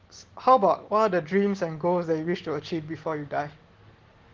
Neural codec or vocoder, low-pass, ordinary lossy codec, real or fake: none; 7.2 kHz; Opus, 16 kbps; real